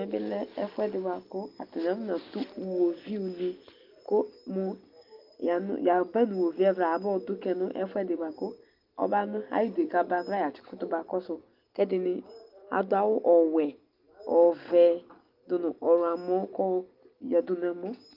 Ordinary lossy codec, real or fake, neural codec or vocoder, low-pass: Opus, 32 kbps; real; none; 5.4 kHz